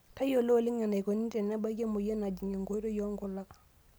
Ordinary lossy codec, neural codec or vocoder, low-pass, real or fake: none; none; none; real